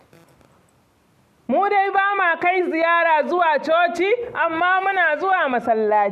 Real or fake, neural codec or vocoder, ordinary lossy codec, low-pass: real; none; none; 14.4 kHz